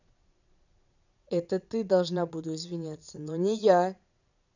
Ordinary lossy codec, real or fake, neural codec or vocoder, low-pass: none; fake; vocoder, 22.05 kHz, 80 mel bands, Vocos; 7.2 kHz